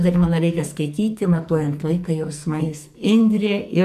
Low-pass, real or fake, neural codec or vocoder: 14.4 kHz; fake; codec, 44.1 kHz, 2.6 kbps, SNAC